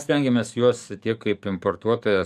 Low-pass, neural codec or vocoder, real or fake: 14.4 kHz; codec, 44.1 kHz, 7.8 kbps, DAC; fake